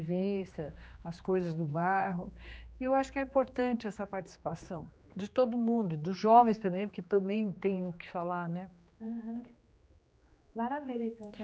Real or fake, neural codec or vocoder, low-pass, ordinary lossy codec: fake; codec, 16 kHz, 2 kbps, X-Codec, HuBERT features, trained on general audio; none; none